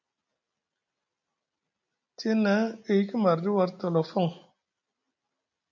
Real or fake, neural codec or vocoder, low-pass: real; none; 7.2 kHz